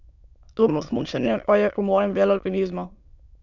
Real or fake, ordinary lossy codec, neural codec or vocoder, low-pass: fake; none; autoencoder, 22.05 kHz, a latent of 192 numbers a frame, VITS, trained on many speakers; 7.2 kHz